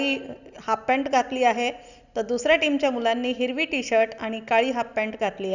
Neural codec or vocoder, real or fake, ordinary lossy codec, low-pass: none; real; none; 7.2 kHz